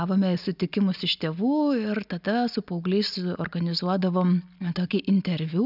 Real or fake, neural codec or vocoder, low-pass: real; none; 5.4 kHz